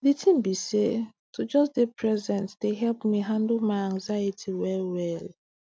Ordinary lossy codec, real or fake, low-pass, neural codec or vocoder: none; real; none; none